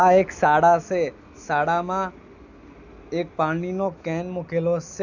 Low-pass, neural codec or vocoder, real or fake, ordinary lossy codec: 7.2 kHz; none; real; none